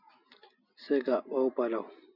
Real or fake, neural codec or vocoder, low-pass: real; none; 5.4 kHz